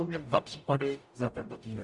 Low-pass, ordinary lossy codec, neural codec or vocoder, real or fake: 10.8 kHz; Opus, 64 kbps; codec, 44.1 kHz, 0.9 kbps, DAC; fake